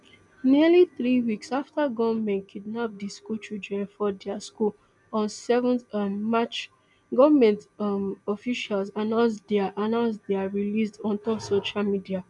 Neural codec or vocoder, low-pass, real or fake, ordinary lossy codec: none; 10.8 kHz; real; none